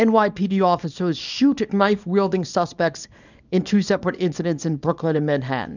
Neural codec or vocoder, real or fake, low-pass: codec, 24 kHz, 0.9 kbps, WavTokenizer, small release; fake; 7.2 kHz